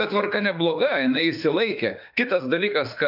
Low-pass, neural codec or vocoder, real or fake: 5.4 kHz; autoencoder, 48 kHz, 32 numbers a frame, DAC-VAE, trained on Japanese speech; fake